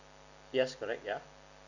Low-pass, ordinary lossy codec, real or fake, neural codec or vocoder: 7.2 kHz; none; real; none